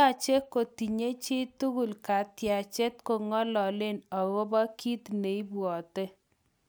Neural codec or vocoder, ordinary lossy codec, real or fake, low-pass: none; none; real; none